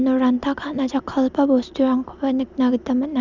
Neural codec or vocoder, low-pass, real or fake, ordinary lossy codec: none; 7.2 kHz; real; none